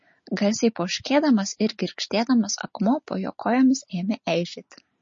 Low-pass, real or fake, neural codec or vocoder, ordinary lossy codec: 7.2 kHz; real; none; MP3, 32 kbps